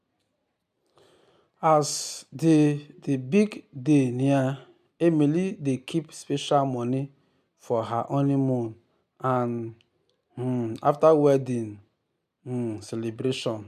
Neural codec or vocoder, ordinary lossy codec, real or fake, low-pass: none; none; real; 14.4 kHz